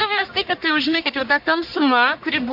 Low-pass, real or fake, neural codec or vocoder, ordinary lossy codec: 5.4 kHz; fake; codec, 44.1 kHz, 1.7 kbps, Pupu-Codec; MP3, 48 kbps